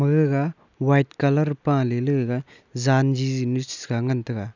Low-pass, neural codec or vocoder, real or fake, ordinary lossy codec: 7.2 kHz; none; real; none